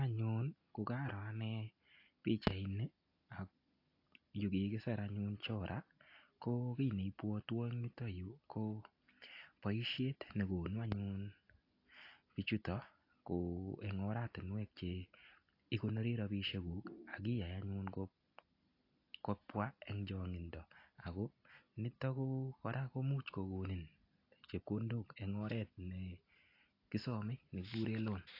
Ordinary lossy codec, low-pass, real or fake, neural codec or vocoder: AAC, 32 kbps; 5.4 kHz; real; none